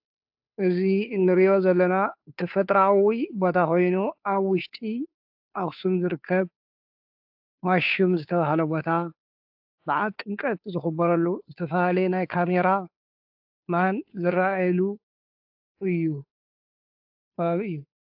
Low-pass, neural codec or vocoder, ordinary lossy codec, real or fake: 5.4 kHz; codec, 16 kHz, 2 kbps, FunCodec, trained on Chinese and English, 25 frames a second; AAC, 48 kbps; fake